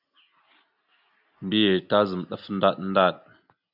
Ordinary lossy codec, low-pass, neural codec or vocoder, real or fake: AAC, 48 kbps; 5.4 kHz; none; real